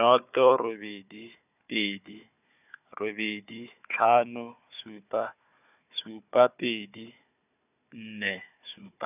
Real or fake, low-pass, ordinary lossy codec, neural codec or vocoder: fake; 3.6 kHz; none; codec, 16 kHz, 4 kbps, FunCodec, trained on Chinese and English, 50 frames a second